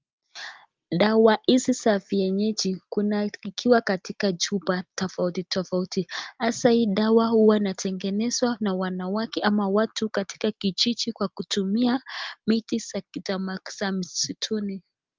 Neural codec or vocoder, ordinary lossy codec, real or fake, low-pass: none; Opus, 32 kbps; real; 7.2 kHz